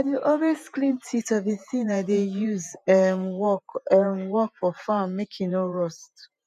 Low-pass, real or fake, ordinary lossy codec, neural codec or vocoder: 14.4 kHz; fake; none; vocoder, 48 kHz, 128 mel bands, Vocos